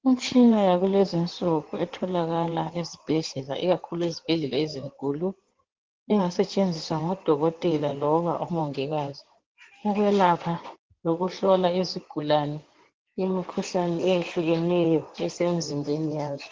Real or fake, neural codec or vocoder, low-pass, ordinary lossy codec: fake; codec, 16 kHz in and 24 kHz out, 2.2 kbps, FireRedTTS-2 codec; 7.2 kHz; Opus, 16 kbps